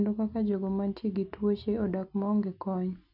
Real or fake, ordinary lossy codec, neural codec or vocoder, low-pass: real; none; none; 5.4 kHz